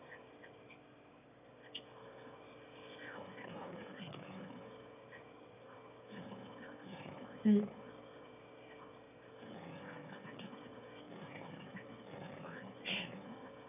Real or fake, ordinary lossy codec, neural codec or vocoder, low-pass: fake; none; autoencoder, 22.05 kHz, a latent of 192 numbers a frame, VITS, trained on one speaker; 3.6 kHz